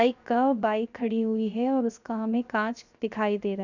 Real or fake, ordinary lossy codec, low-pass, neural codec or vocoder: fake; none; 7.2 kHz; codec, 16 kHz, 0.7 kbps, FocalCodec